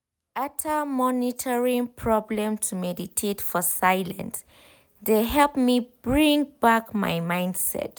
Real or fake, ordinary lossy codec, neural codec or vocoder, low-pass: real; none; none; none